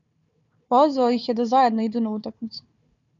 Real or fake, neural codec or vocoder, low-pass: fake; codec, 16 kHz, 4 kbps, FunCodec, trained on Chinese and English, 50 frames a second; 7.2 kHz